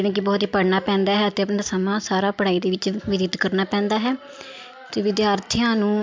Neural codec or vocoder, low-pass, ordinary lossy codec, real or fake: none; 7.2 kHz; MP3, 64 kbps; real